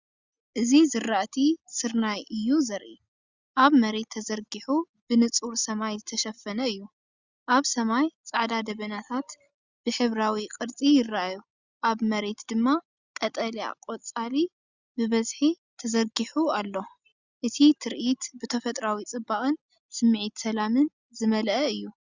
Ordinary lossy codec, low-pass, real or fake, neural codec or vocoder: Opus, 64 kbps; 7.2 kHz; real; none